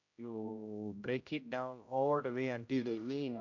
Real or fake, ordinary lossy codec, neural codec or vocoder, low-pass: fake; AAC, 48 kbps; codec, 16 kHz, 0.5 kbps, X-Codec, HuBERT features, trained on general audio; 7.2 kHz